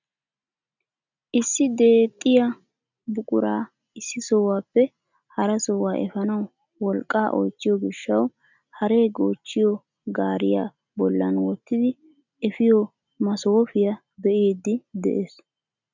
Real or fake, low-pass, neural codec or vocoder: real; 7.2 kHz; none